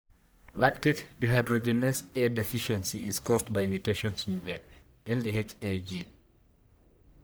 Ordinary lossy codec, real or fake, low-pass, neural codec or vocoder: none; fake; none; codec, 44.1 kHz, 1.7 kbps, Pupu-Codec